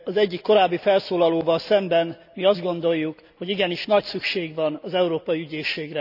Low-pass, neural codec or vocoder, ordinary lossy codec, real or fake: 5.4 kHz; none; none; real